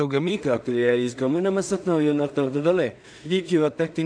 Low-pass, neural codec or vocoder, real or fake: 9.9 kHz; codec, 16 kHz in and 24 kHz out, 0.4 kbps, LongCat-Audio-Codec, two codebook decoder; fake